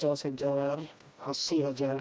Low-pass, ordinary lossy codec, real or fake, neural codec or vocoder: none; none; fake; codec, 16 kHz, 1 kbps, FreqCodec, smaller model